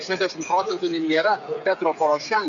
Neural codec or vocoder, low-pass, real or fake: codec, 16 kHz, 8 kbps, FreqCodec, smaller model; 7.2 kHz; fake